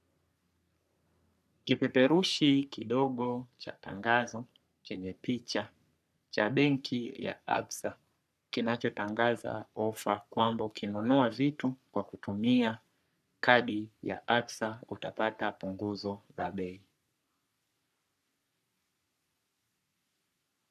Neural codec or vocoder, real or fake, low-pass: codec, 44.1 kHz, 3.4 kbps, Pupu-Codec; fake; 14.4 kHz